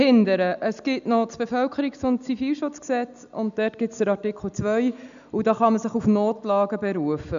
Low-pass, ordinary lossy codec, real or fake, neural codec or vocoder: 7.2 kHz; none; real; none